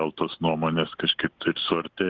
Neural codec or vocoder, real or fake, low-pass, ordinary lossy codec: none; real; 7.2 kHz; Opus, 16 kbps